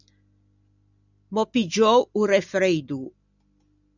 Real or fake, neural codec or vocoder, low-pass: real; none; 7.2 kHz